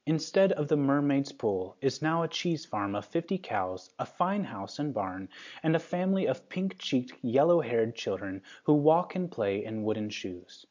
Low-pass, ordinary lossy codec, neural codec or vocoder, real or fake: 7.2 kHz; MP3, 64 kbps; none; real